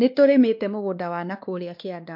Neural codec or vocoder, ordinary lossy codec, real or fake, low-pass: codec, 16 kHz, 2 kbps, X-Codec, WavLM features, trained on Multilingual LibriSpeech; MP3, 48 kbps; fake; 5.4 kHz